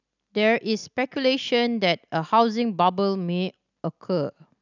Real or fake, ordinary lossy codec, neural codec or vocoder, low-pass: real; none; none; 7.2 kHz